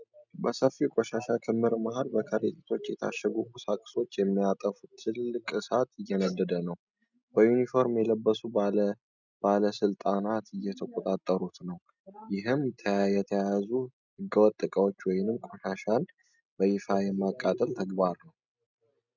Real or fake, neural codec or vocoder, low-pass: real; none; 7.2 kHz